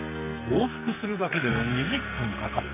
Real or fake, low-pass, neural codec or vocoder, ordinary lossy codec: fake; 3.6 kHz; codec, 44.1 kHz, 2.6 kbps, SNAC; none